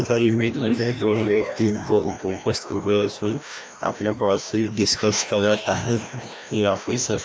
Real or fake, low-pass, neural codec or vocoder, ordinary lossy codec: fake; none; codec, 16 kHz, 1 kbps, FreqCodec, larger model; none